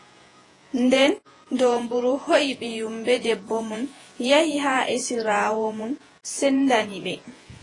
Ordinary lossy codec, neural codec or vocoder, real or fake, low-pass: AAC, 32 kbps; vocoder, 48 kHz, 128 mel bands, Vocos; fake; 10.8 kHz